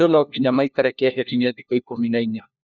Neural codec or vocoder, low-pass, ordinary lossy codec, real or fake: codec, 16 kHz, 1 kbps, FunCodec, trained on LibriTTS, 50 frames a second; 7.2 kHz; none; fake